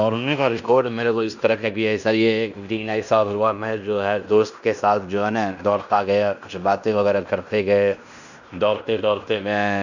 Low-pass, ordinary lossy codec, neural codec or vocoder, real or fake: 7.2 kHz; none; codec, 16 kHz in and 24 kHz out, 0.9 kbps, LongCat-Audio-Codec, fine tuned four codebook decoder; fake